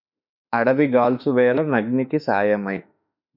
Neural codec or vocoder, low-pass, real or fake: autoencoder, 48 kHz, 32 numbers a frame, DAC-VAE, trained on Japanese speech; 5.4 kHz; fake